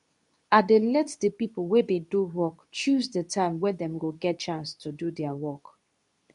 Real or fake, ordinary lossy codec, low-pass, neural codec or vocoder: fake; none; 10.8 kHz; codec, 24 kHz, 0.9 kbps, WavTokenizer, medium speech release version 2